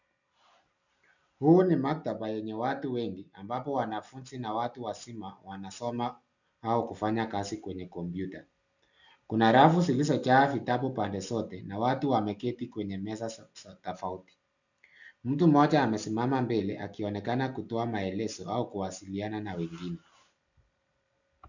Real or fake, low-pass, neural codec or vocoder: real; 7.2 kHz; none